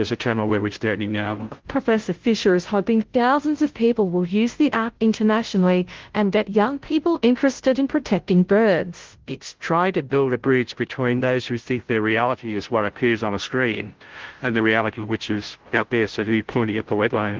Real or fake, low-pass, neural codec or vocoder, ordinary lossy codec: fake; 7.2 kHz; codec, 16 kHz, 0.5 kbps, FunCodec, trained on Chinese and English, 25 frames a second; Opus, 16 kbps